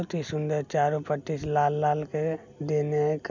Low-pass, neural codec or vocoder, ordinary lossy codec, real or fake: 7.2 kHz; none; none; real